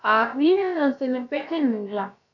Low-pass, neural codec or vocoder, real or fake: 7.2 kHz; codec, 16 kHz, about 1 kbps, DyCAST, with the encoder's durations; fake